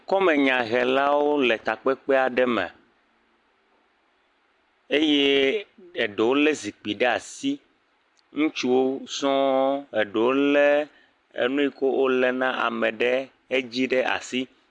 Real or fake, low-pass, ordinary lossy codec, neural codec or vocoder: real; 10.8 kHz; AAC, 64 kbps; none